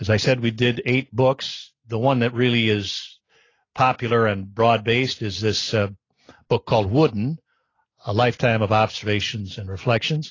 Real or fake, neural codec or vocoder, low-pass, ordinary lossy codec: real; none; 7.2 kHz; AAC, 32 kbps